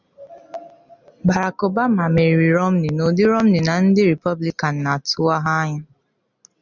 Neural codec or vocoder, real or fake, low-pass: none; real; 7.2 kHz